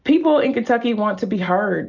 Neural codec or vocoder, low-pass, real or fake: none; 7.2 kHz; real